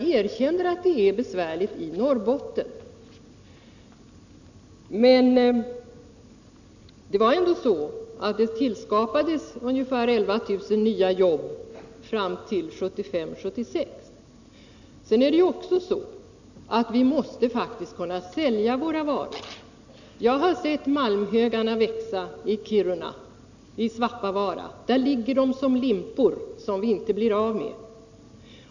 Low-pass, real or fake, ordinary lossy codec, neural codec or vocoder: 7.2 kHz; real; none; none